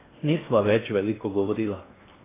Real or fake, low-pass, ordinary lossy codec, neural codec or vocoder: fake; 3.6 kHz; AAC, 16 kbps; codec, 16 kHz in and 24 kHz out, 0.8 kbps, FocalCodec, streaming, 65536 codes